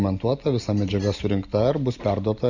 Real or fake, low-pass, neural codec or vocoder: real; 7.2 kHz; none